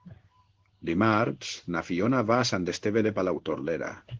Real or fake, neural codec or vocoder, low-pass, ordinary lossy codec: fake; codec, 16 kHz in and 24 kHz out, 1 kbps, XY-Tokenizer; 7.2 kHz; Opus, 16 kbps